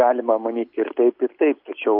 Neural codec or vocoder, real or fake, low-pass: none; real; 5.4 kHz